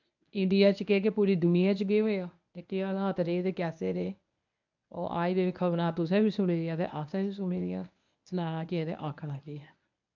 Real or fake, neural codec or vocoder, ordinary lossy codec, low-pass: fake; codec, 24 kHz, 0.9 kbps, WavTokenizer, medium speech release version 1; none; 7.2 kHz